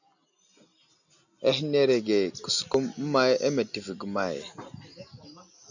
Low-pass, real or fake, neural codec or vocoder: 7.2 kHz; real; none